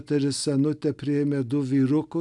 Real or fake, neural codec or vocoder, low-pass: real; none; 10.8 kHz